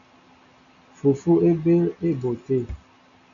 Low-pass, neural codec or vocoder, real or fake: 7.2 kHz; none; real